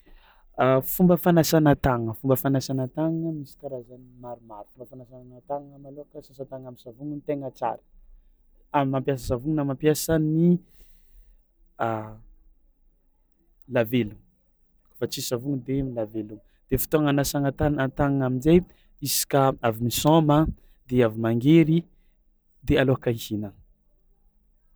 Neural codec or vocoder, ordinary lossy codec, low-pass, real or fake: none; none; none; real